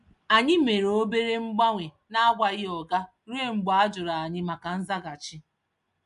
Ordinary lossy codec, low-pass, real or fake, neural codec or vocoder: MP3, 64 kbps; 10.8 kHz; real; none